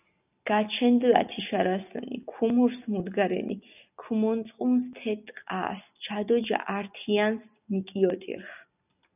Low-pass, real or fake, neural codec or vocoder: 3.6 kHz; real; none